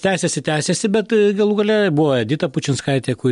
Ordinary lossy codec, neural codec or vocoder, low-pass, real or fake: MP3, 64 kbps; none; 10.8 kHz; real